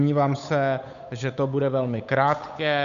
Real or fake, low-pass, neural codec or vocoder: fake; 7.2 kHz; codec, 16 kHz, 8 kbps, FunCodec, trained on Chinese and English, 25 frames a second